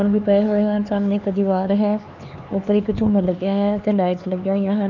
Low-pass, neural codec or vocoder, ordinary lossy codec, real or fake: 7.2 kHz; codec, 16 kHz, 4 kbps, X-Codec, HuBERT features, trained on LibriSpeech; none; fake